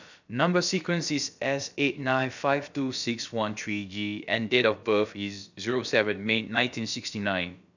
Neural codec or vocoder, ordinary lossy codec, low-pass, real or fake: codec, 16 kHz, about 1 kbps, DyCAST, with the encoder's durations; none; 7.2 kHz; fake